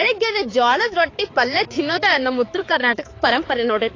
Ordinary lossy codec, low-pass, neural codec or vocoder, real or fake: AAC, 32 kbps; 7.2 kHz; codec, 16 kHz, 4 kbps, X-Codec, HuBERT features, trained on balanced general audio; fake